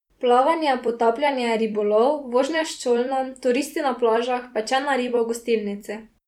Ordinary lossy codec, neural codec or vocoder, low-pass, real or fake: none; vocoder, 44.1 kHz, 128 mel bands every 256 samples, BigVGAN v2; 19.8 kHz; fake